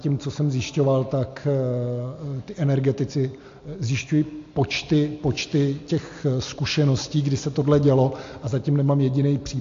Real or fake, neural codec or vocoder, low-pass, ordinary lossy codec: real; none; 7.2 kHz; AAC, 48 kbps